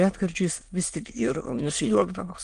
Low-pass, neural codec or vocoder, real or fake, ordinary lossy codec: 9.9 kHz; autoencoder, 22.05 kHz, a latent of 192 numbers a frame, VITS, trained on many speakers; fake; Opus, 24 kbps